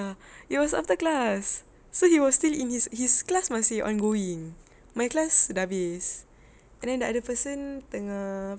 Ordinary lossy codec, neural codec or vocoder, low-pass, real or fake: none; none; none; real